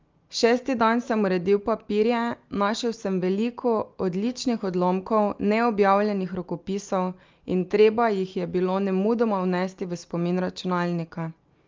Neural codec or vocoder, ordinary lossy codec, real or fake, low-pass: none; Opus, 24 kbps; real; 7.2 kHz